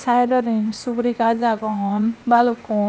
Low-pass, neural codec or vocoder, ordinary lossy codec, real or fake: none; codec, 16 kHz, 0.8 kbps, ZipCodec; none; fake